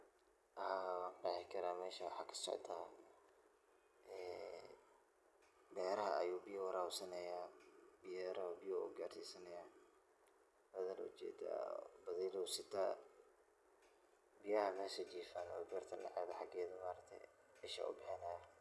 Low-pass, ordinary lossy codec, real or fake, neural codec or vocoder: none; none; real; none